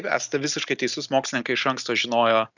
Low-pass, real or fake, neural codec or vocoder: 7.2 kHz; real; none